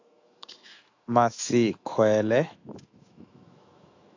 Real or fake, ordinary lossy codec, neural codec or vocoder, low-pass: fake; AAC, 48 kbps; autoencoder, 48 kHz, 128 numbers a frame, DAC-VAE, trained on Japanese speech; 7.2 kHz